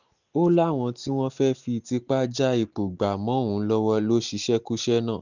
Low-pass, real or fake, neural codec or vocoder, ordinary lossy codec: 7.2 kHz; real; none; none